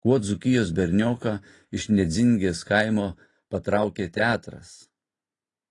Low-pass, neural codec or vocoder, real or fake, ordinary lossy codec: 10.8 kHz; none; real; AAC, 32 kbps